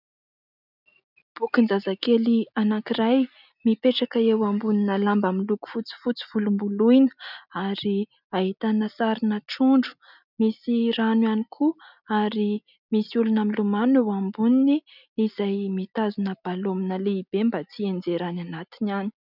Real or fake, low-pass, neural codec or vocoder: real; 5.4 kHz; none